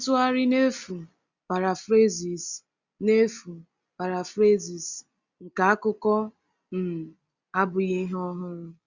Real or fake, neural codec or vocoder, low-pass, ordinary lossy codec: real; none; 7.2 kHz; Opus, 64 kbps